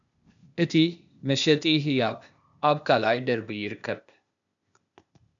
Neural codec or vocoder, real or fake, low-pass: codec, 16 kHz, 0.8 kbps, ZipCodec; fake; 7.2 kHz